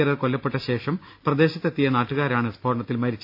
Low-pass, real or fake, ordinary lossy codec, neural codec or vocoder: 5.4 kHz; real; AAC, 48 kbps; none